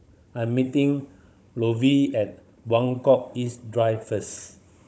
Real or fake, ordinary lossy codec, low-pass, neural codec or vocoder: fake; none; none; codec, 16 kHz, 16 kbps, FunCodec, trained on Chinese and English, 50 frames a second